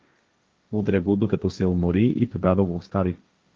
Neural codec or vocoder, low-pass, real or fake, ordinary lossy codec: codec, 16 kHz, 1.1 kbps, Voila-Tokenizer; 7.2 kHz; fake; Opus, 24 kbps